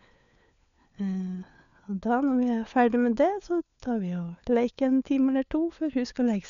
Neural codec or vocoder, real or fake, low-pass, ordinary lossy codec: codec, 16 kHz, 4 kbps, FunCodec, trained on LibriTTS, 50 frames a second; fake; 7.2 kHz; none